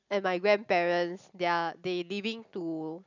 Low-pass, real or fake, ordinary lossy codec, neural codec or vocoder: 7.2 kHz; real; none; none